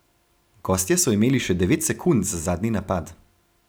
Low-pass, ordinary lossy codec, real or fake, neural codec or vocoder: none; none; real; none